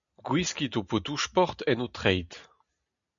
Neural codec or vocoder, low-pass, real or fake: none; 7.2 kHz; real